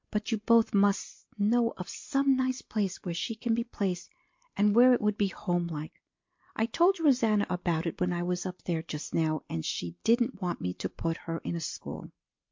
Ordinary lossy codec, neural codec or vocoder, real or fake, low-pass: MP3, 48 kbps; none; real; 7.2 kHz